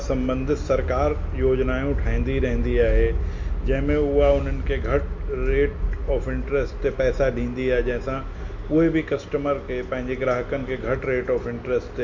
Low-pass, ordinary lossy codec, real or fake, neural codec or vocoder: 7.2 kHz; MP3, 48 kbps; real; none